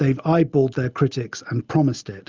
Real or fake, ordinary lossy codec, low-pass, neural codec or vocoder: real; Opus, 32 kbps; 7.2 kHz; none